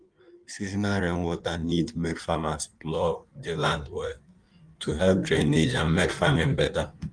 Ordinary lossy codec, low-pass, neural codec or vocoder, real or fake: Opus, 32 kbps; 9.9 kHz; codec, 16 kHz in and 24 kHz out, 1.1 kbps, FireRedTTS-2 codec; fake